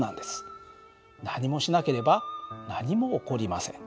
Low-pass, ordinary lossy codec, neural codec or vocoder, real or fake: none; none; none; real